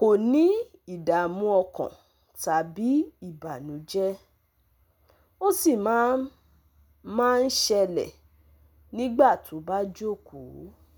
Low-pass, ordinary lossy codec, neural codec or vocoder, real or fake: none; none; none; real